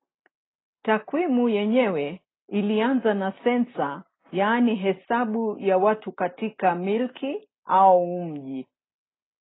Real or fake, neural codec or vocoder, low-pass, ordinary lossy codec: real; none; 7.2 kHz; AAC, 16 kbps